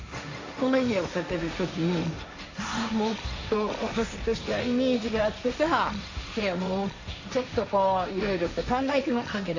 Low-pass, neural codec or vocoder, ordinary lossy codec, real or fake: 7.2 kHz; codec, 16 kHz, 1.1 kbps, Voila-Tokenizer; AAC, 48 kbps; fake